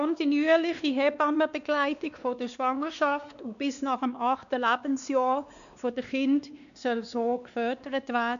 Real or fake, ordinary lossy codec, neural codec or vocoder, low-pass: fake; none; codec, 16 kHz, 2 kbps, X-Codec, WavLM features, trained on Multilingual LibriSpeech; 7.2 kHz